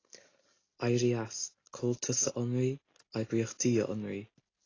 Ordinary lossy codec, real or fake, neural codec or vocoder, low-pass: AAC, 32 kbps; real; none; 7.2 kHz